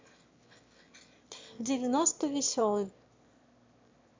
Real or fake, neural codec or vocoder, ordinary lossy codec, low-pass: fake; autoencoder, 22.05 kHz, a latent of 192 numbers a frame, VITS, trained on one speaker; MP3, 64 kbps; 7.2 kHz